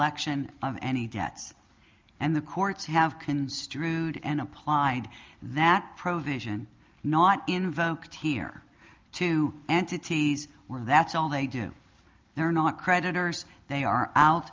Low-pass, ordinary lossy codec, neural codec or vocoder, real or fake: 7.2 kHz; Opus, 32 kbps; none; real